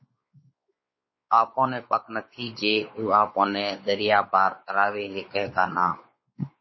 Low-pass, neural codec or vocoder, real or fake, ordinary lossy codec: 7.2 kHz; codec, 16 kHz, 4 kbps, X-Codec, WavLM features, trained on Multilingual LibriSpeech; fake; MP3, 24 kbps